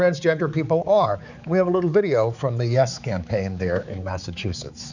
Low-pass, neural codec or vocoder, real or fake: 7.2 kHz; codec, 16 kHz, 4 kbps, X-Codec, HuBERT features, trained on balanced general audio; fake